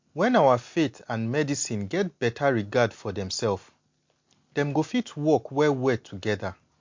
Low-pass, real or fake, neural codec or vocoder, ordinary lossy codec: 7.2 kHz; real; none; MP3, 48 kbps